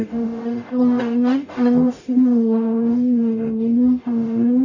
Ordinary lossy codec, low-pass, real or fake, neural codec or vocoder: none; 7.2 kHz; fake; codec, 44.1 kHz, 0.9 kbps, DAC